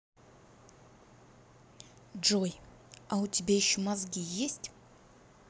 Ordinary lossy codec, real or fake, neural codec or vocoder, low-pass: none; real; none; none